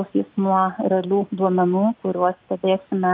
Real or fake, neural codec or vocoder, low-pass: real; none; 5.4 kHz